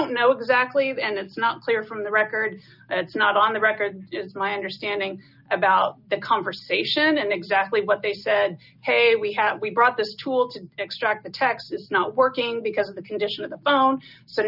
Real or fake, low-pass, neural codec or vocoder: real; 5.4 kHz; none